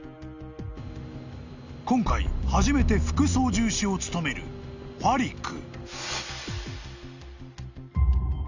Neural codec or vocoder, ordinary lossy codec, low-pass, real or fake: none; none; 7.2 kHz; real